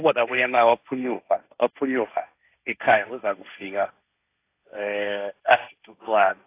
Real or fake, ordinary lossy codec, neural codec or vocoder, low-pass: fake; AAC, 24 kbps; codec, 16 kHz, 1.1 kbps, Voila-Tokenizer; 3.6 kHz